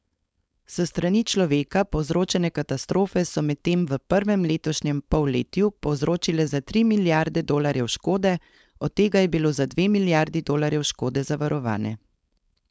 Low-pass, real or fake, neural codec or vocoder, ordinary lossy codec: none; fake; codec, 16 kHz, 4.8 kbps, FACodec; none